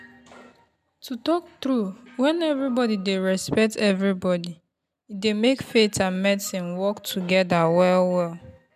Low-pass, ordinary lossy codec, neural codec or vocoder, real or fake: 14.4 kHz; none; none; real